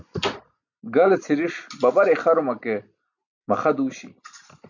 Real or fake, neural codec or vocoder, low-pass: real; none; 7.2 kHz